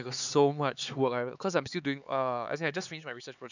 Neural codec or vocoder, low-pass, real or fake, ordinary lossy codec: codec, 16 kHz, 4 kbps, X-Codec, HuBERT features, trained on LibriSpeech; 7.2 kHz; fake; none